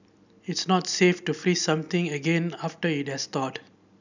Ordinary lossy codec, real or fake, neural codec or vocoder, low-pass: none; real; none; 7.2 kHz